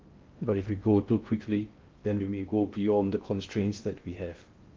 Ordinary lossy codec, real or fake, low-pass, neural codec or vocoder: Opus, 32 kbps; fake; 7.2 kHz; codec, 16 kHz in and 24 kHz out, 0.6 kbps, FocalCodec, streaming, 2048 codes